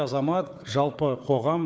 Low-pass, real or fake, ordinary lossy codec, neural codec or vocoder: none; fake; none; codec, 16 kHz, 4 kbps, FunCodec, trained on Chinese and English, 50 frames a second